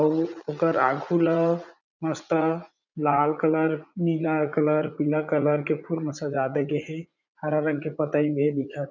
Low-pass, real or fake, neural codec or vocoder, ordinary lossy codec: 7.2 kHz; fake; vocoder, 44.1 kHz, 80 mel bands, Vocos; none